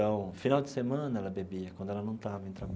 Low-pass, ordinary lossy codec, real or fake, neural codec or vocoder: none; none; real; none